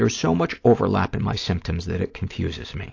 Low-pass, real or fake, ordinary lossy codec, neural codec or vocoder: 7.2 kHz; real; AAC, 48 kbps; none